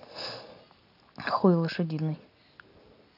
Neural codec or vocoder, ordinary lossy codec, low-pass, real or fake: none; none; 5.4 kHz; real